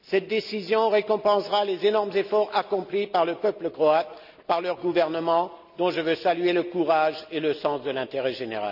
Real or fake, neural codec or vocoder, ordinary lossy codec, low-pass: real; none; none; 5.4 kHz